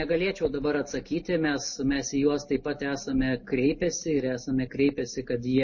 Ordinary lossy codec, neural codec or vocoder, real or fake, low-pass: MP3, 32 kbps; none; real; 7.2 kHz